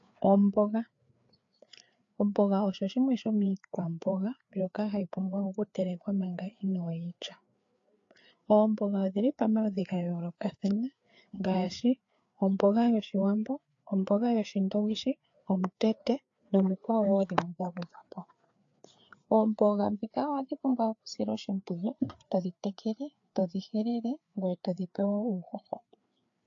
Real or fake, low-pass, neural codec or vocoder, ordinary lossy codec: fake; 7.2 kHz; codec, 16 kHz, 4 kbps, FreqCodec, larger model; AAC, 48 kbps